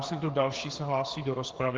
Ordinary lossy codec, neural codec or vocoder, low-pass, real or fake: Opus, 16 kbps; codec, 16 kHz, 8 kbps, FreqCodec, smaller model; 7.2 kHz; fake